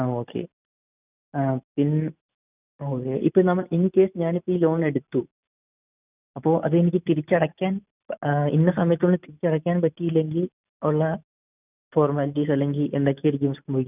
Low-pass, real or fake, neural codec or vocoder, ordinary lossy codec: 3.6 kHz; real; none; none